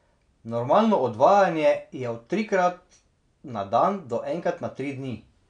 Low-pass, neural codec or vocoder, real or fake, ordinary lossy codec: 9.9 kHz; none; real; none